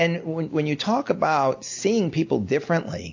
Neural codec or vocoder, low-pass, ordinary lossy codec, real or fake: none; 7.2 kHz; AAC, 48 kbps; real